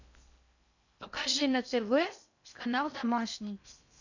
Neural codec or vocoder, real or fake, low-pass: codec, 16 kHz in and 24 kHz out, 0.6 kbps, FocalCodec, streaming, 4096 codes; fake; 7.2 kHz